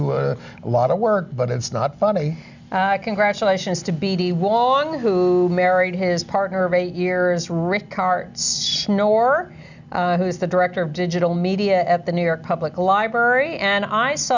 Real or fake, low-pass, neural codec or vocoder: real; 7.2 kHz; none